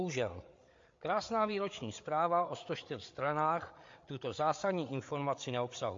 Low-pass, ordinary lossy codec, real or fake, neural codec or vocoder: 7.2 kHz; MP3, 48 kbps; fake; codec, 16 kHz, 8 kbps, FreqCodec, larger model